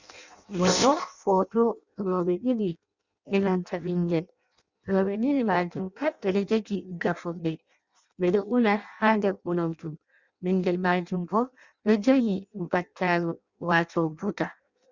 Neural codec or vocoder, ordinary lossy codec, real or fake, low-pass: codec, 16 kHz in and 24 kHz out, 0.6 kbps, FireRedTTS-2 codec; Opus, 64 kbps; fake; 7.2 kHz